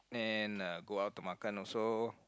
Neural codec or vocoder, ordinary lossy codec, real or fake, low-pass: none; none; real; none